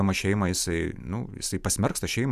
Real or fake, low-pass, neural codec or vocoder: fake; 14.4 kHz; vocoder, 44.1 kHz, 128 mel bands every 512 samples, BigVGAN v2